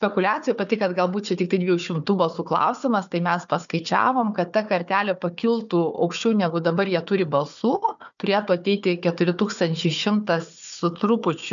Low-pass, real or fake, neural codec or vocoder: 7.2 kHz; fake; codec, 16 kHz, 4 kbps, FunCodec, trained on Chinese and English, 50 frames a second